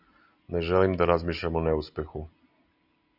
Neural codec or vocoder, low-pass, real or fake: none; 5.4 kHz; real